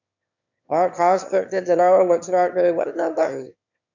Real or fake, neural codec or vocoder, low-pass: fake; autoencoder, 22.05 kHz, a latent of 192 numbers a frame, VITS, trained on one speaker; 7.2 kHz